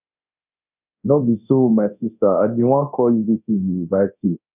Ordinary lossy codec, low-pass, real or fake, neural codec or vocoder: none; 3.6 kHz; fake; codec, 24 kHz, 0.9 kbps, DualCodec